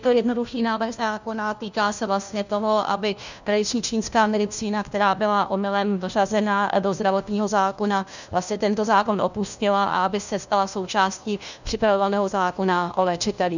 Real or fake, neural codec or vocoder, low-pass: fake; codec, 16 kHz, 1 kbps, FunCodec, trained on LibriTTS, 50 frames a second; 7.2 kHz